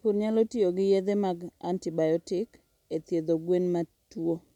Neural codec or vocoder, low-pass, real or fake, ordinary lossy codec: none; 19.8 kHz; real; none